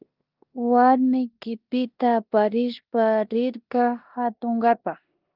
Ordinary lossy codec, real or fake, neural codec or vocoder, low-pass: Opus, 24 kbps; fake; codec, 16 kHz in and 24 kHz out, 0.9 kbps, LongCat-Audio-Codec, four codebook decoder; 5.4 kHz